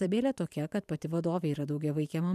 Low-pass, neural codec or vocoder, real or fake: 14.4 kHz; vocoder, 44.1 kHz, 128 mel bands every 512 samples, BigVGAN v2; fake